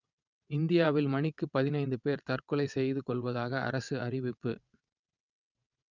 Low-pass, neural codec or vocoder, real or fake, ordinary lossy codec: 7.2 kHz; vocoder, 22.05 kHz, 80 mel bands, WaveNeXt; fake; none